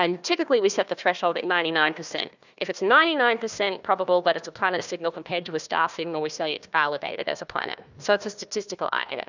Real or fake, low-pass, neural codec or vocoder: fake; 7.2 kHz; codec, 16 kHz, 1 kbps, FunCodec, trained on Chinese and English, 50 frames a second